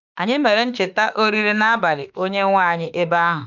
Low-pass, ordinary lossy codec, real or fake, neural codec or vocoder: 7.2 kHz; none; fake; autoencoder, 48 kHz, 32 numbers a frame, DAC-VAE, trained on Japanese speech